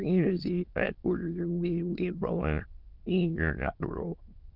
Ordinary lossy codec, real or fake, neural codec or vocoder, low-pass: Opus, 32 kbps; fake; autoencoder, 22.05 kHz, a latent of 192 numbers a frame, VITS, trained on many speakers; 5.4 kHz